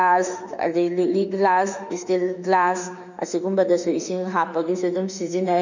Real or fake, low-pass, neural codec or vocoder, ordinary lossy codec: fake; 7.2 kHz; autoencoder, 48 kHz, 32 numbers a frame, DAC-VAE, trained on Japanese speech; none